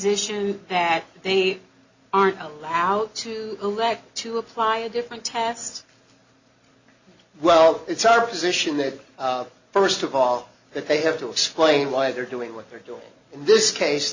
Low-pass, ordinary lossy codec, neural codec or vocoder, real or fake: 7.2 kHz; Opus, 64 kbps; none; real